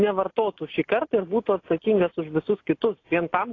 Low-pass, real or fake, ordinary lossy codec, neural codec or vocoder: 7.2 kHz; real; AAC, 32 kbps; none